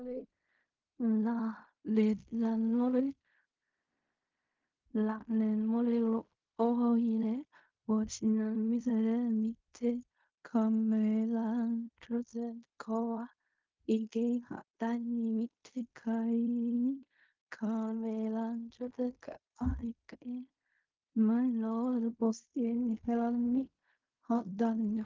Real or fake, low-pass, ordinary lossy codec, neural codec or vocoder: fake; 7.2 kHz; Opus, 24 kbps; codec, 16 kHz in and 24 kHz out, 0.4 kbps, LongCat-Audio-Codec, fine tuned four codebook decoder